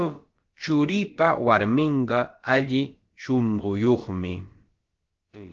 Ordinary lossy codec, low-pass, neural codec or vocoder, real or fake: Opus, 16 kbps; 7.2 kHz; codec, 16 kHz, about 1 kbps, DyCAST, with the encoder's durations; fake